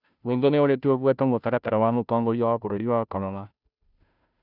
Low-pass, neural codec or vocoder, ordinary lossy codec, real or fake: 5.4 kHz; codec, 16 kHz, 0.5 kbps, FunCodec, trained on Chinese and English, 25 frames a second; none; fake